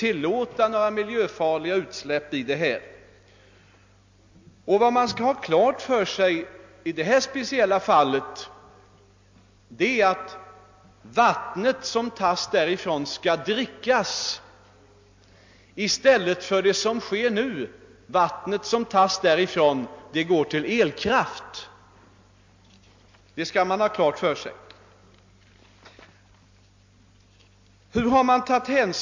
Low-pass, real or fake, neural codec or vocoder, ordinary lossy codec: 7.2 kHz; real; none; MP3, 48 kbps